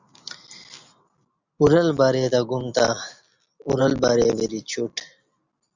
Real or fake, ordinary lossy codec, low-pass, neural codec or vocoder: real; Opus, 64 kbps; 7.2 kHz; none